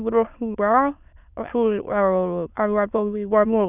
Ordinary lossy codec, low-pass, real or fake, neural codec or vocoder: none; 3.6 kHz; fake; autoencoder, 22.05 kHz, a latent of 192 numbers a frame, VITS, trained on many speakers